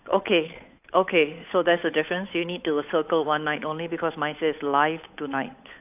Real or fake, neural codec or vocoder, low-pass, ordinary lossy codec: fake; codec, 16 kHz, 8 kbps, FunCodec, trained on Chinese and English, 25 frames a second; 3.6 kHz; AAC, 32 kbps